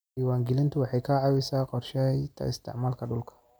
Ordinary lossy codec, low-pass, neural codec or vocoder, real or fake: none; none; none; real